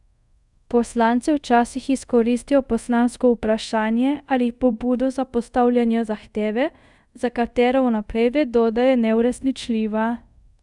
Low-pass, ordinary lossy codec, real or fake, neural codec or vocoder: 10.8 kHz; none; fake; codec, 24 kHz, 0.5 kbps, DualCodec